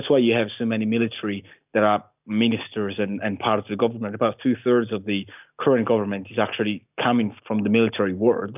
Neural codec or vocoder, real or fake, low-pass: none; real; 3.6 kHz